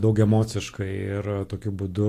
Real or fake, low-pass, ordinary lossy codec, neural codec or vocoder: real; 14.4 kHz; AAC, 48 kbps; none